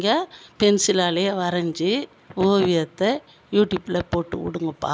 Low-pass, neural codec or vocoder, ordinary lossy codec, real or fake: none; none; none; real